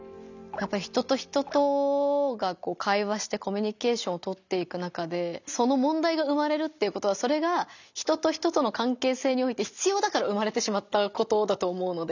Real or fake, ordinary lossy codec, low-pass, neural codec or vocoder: real; none; 7.2 kHz; none